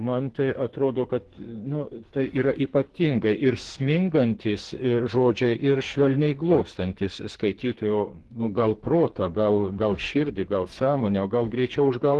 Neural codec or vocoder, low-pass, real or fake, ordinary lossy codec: codec, 44.1 kHz, 2.6 kbps, SNAC; 10.8 kHz; fake; Opus, 16 kbps